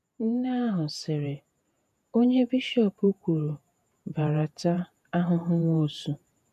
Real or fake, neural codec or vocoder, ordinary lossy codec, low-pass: fake; vocoder, 48 kHz, 128 mel bands, Vocos; none; 14.4 kHz